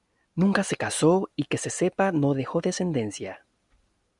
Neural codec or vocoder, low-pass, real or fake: none; 10.8 kHz; real